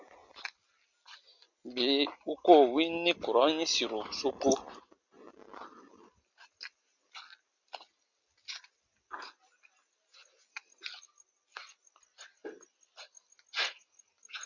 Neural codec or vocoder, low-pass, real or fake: none; 7.2 kHz; real